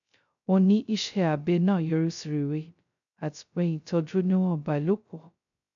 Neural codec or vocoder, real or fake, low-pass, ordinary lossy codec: codec, 16 kHz, 0.2 kbps, FocalCodec; fake; 7.2 kHz; none